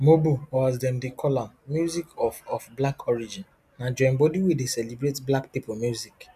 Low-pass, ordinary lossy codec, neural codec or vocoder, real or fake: 14.4 kHz; none; none; real